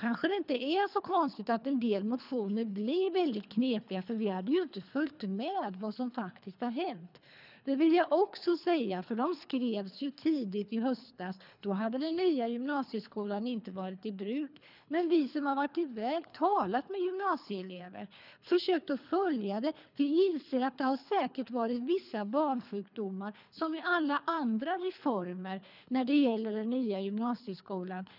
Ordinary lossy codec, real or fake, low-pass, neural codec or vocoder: none; fake; 5.4 kHz; codec, 24 kHz, 3 kbps, HILCodec